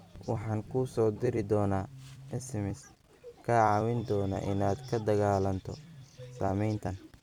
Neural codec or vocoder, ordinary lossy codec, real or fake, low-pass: vocoder, 44.1 kHz, 128 mel bands every 256 samples, BigVGAN v2; none; fake; 19.8 kHz